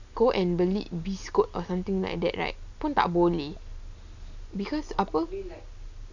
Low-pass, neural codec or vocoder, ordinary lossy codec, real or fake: 7.2 kHz; none; none; real